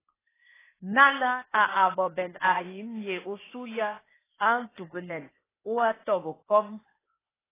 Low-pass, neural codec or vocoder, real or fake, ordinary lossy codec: 3.6 kHz; codec, 16 kHz, 0.8 kbps, ZipCodec; fake; AAC, 16 kbps